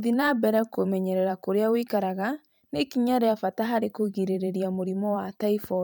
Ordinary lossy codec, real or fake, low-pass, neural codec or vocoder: none; fake; none; vocoder, 44.1 kHz, 128 mel bands every 512 samples, BigVGAN v2